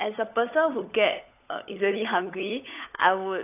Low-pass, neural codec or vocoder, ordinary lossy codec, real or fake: 3.6 kHz; codec, 16 kHz, 16 kbps, FunCodec, trained on LibriTTS, 50 frames a second; AAC, 24 kbps; fake